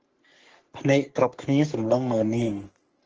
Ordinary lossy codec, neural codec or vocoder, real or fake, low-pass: Opus, 16 kbps; codec, 44.1 kHz, 3.4 kbps, Pupu-Codec; fake; 7.2 kHz